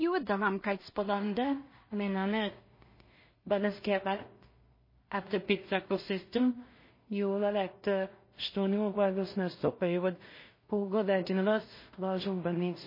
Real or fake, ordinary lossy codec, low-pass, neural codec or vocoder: fake; MP3, 24 kbps; 5.4 kHz; codec, 16 kHz in and 24 kHz out, 0.4 kbps, LongCat-Audio-Codec, two codebook decoder